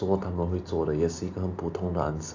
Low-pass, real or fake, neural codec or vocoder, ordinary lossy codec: 7.2 kHz; real; none; AAC, 48 kbps